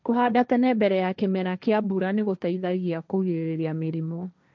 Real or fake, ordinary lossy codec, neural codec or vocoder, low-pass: fake; none; codec, 16 kHz, 1.1 kbps, Voila-Tokenizer; none